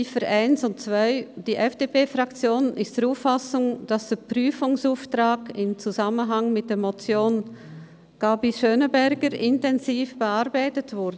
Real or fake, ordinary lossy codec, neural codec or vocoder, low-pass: real; none; none; none